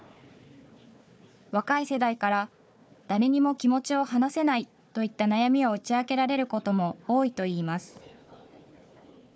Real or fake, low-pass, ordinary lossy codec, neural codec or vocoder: fake; none; none; codec, 16 kHz, 4 kbps, FunCodec, trained on Chinese and English, 50 frames a second